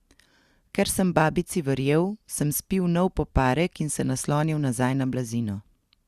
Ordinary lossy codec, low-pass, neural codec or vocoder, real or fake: Opus, 64 kbps; 14.4 kHz; none; real